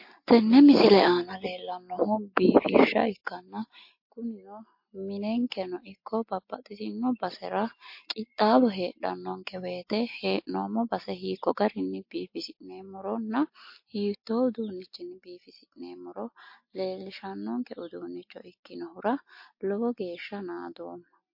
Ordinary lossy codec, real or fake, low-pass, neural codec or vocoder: MP3, 32 kbps; real; 5.4 kHz; none